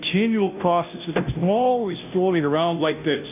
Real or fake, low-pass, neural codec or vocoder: fake; 3.6 kHz; codec, 16 kHz, 0.5 kbps, FunCodec, trained on Chinese and English, 25 frames a second